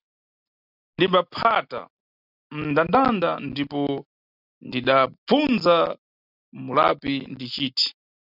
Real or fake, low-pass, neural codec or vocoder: real; 5.4 kHz; none